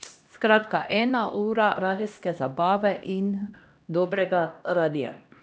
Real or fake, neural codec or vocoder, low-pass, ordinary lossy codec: fake; codec, 16 kHz, 1 kbps, X-Codec, HuBERT features, trained on LibriSpeech; none; none